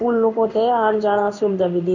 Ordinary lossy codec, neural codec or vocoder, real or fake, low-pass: none; codec, 16 kHz in and 24 kHz out, 1 kbps, XY-Tokenizer; fake; 7.2 kHz